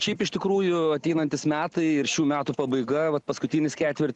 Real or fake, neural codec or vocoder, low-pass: real; none; 10.8 kHz